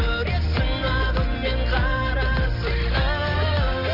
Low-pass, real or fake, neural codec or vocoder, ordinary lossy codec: 5.4 kHz; real; none; none